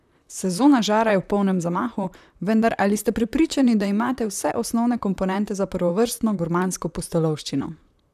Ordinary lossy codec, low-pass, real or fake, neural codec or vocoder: none; 14.4 kHz; fake; vocoder, 44.1 kHz, 128 mel bands, Pupu-Vocoder